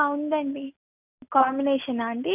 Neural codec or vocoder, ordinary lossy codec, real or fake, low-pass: none; none; real; 3.6 kHz